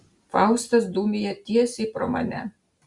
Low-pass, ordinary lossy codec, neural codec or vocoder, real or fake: 10.8 kHz; Opus, 64 kbps; none; real